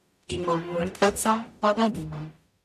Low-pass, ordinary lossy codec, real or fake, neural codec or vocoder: 14.4 kHz; none; fake; codec, 44.1 kHz, 0.9 kbps, DAC